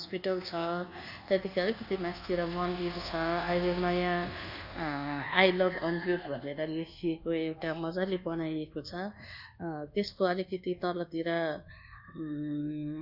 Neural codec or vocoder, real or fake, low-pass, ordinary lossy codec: codec, 24 kHz, 1.2 kbps, DualCodec; fake; 5.4 kHz; none